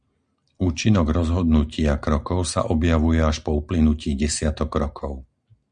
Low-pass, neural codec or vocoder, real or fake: 9.9 kHz; none; real